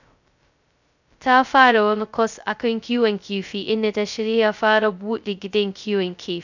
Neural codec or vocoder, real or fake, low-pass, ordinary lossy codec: codec, 16 kHz, 0.2 kbps, FocalCodec; fake; 7.2 kHz; none